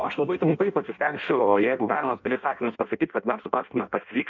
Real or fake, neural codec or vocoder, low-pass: fake; codec, 16 kHz in and 24 kHz out, 0.6 kbps, FireRedTTS-2 codec; 7.2 kHz